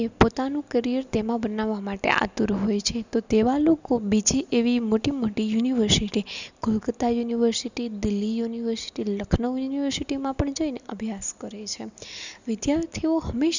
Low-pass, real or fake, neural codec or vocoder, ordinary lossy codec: 7.2 kHz; real; none; none